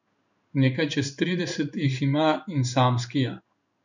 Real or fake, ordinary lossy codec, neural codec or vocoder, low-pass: fake; none; codec, 16 kHz in and 24 kHz out, 1 kbps, XY-Tokenizer; 7.2 kHz